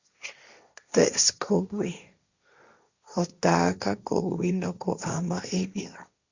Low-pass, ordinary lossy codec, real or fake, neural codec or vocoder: 7.2 kHz; Opus, 64 kbps; fake; codec, 16 kHz, 1.1 kbps, Voila-Tokenizer